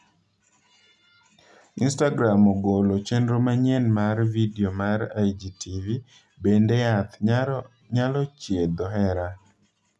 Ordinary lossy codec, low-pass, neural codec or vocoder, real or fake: none; none; none; real